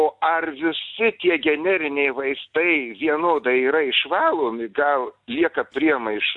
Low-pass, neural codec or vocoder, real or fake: 10.8 kHz; none; real